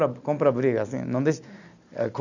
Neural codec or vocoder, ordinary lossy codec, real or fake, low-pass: none; none; real; 7.2 kHz